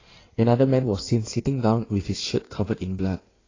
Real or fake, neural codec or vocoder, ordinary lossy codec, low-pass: fake; codec, 16 kHz in and 24 kHz out, 1.1 kbps, FireRedTTS-2 codec; AAC, 32 kbps; 7.2 kHz